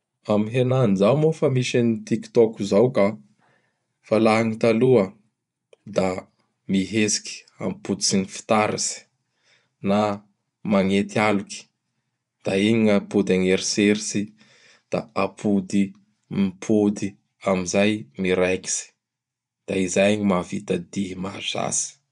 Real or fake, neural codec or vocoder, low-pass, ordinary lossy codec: fake; vocoder, 24 kHz, 100 mel bands, Vocos; 10.8 kHz; none